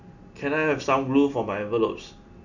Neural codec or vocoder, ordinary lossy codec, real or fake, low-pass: none; none; real; 7.2 kHz